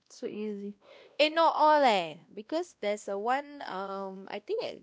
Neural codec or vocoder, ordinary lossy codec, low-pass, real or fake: codec, 16 kHz, 1 kbps, X-Codec, WavLM features, trained on Multilingual LibriSpeech; none; none; fake